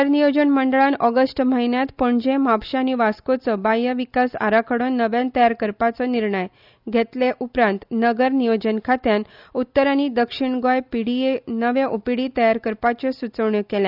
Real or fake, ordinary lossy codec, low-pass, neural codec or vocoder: real; none; 5.4 kHz; none